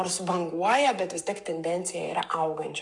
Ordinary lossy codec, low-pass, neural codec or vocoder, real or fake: MP3, 96 kbps; 10.8 kHz; codec, 44.1 kHz, 7.8 kbps, Pupu-Codec; fake